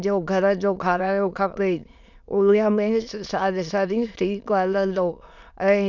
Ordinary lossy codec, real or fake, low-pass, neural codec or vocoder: none; fake; 7.2 kHz; autoencoder, 22.05 kHz, a latent of 192 numbers a frame, VITS, trained on many speakers